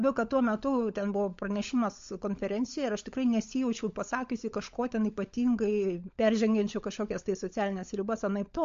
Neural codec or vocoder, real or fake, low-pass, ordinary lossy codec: codec, 16 kHz, 16 kbps, FunCodec, trained on LibriTTS, 50 frames a second; fake; 7.2 kHz; MP3, 48 kbps